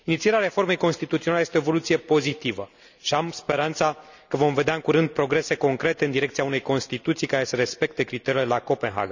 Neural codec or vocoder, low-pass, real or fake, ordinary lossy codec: none; 7.2 kHz; real; none